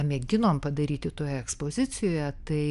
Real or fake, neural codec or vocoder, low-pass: real; none; 10.8 kHz